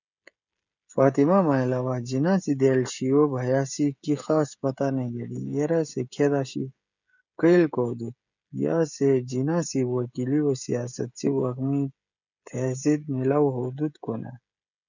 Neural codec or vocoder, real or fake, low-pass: codec, 16 kHz, 16 kbps, FreqCodec, smaller model; fake; 7.2 kHz